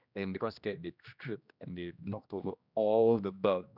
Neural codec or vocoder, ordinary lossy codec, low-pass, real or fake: codec, 16 kHz, 1 kbps, X-Codec, HuBERT features, trained on general audio; none; 5.4 kHz; fake